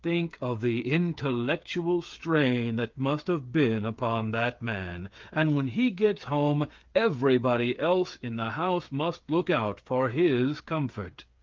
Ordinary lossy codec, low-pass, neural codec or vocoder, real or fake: Opus, 24 kbps; 7.2 kHz; codec, 16 kHz, 8 kbps, FreqCodec, smaller model; fake